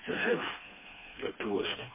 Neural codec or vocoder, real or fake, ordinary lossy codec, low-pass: codec, 16 kHz, 2 kbps, FreqCodec, smaller model; fake; MP3, 16 kbps; 3.6 kHz